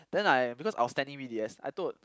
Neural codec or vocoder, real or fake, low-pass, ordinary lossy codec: none; real; none; none